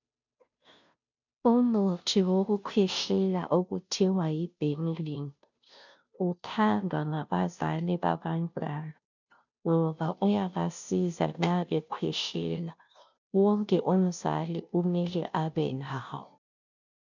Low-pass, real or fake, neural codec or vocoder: 7.2 kHz; fake; codec, 16 kHz, 0.5 kbps, FunCodec, trained on Chinese and English, 25 frames a second